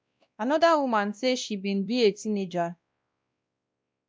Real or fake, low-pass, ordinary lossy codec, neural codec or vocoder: fake; none; none; codec, 16 kHz, 1 kbps, X-Codec, WavLM features, trained on Multilingual LibriSpeech